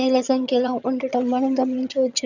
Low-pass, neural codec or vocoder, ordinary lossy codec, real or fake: 7.2 kHz; vocoder, 22.05 kHz, 80 mel bands, HiFi-GAN; none; fake